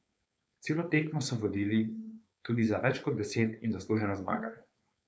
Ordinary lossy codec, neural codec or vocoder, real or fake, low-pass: none; codec, 16 kHz, 4.8 kbps, FACodec; fake; none